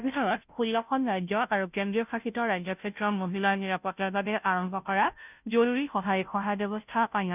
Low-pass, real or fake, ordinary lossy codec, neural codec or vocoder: 3.6 kHz; fake; none; codec, 16 kHz, 0.5 kbps, FunCodec, trained on Chinese and English, 25 frames a second